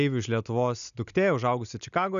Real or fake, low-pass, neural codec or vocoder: real; 7.2 kHz; none